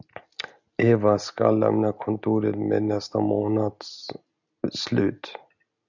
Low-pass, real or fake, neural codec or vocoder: 7.2 kHz; real; none